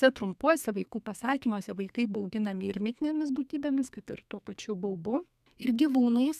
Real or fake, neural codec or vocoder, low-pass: fake; codec, 32 kHz, 1.9 kbps, SNAC; 14.4 kHz